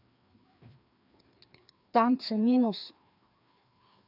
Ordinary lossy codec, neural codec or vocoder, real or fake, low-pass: AAC, 48 kbps; codec, 16 kHz, 2 kbps, FreqCodec, larger model; fake; 5.4 kHz